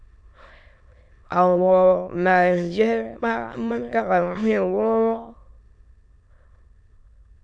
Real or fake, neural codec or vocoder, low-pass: fake; autoencoder, 22.05 kHz, a latent of 192 numbers a frame, VITS, trained on many speakers; 9.9 kHz